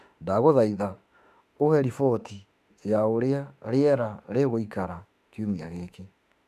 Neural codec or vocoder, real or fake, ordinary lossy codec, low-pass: autoencoder, 48 kHz, 32 numbers a frame, DAC-VAE, trained on Japanese speech; fake; none; 14.4 kHz